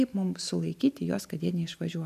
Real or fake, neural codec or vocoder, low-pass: real; none; 14.4 kHz